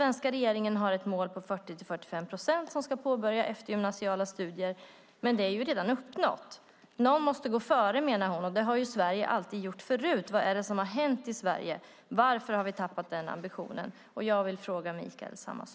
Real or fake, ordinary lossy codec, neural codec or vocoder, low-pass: real; none; none; none